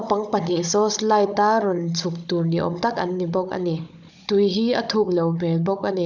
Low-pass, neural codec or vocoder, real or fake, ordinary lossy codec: 7.2 kHz; codec, 16 kHz, 16 kbps, FunCodec, trained on Chinese and English, 50 frames a second; fake; none